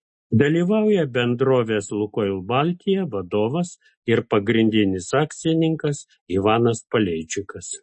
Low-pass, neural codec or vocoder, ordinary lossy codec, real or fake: 10.8 kHz; none; MP3, 32 kbps; real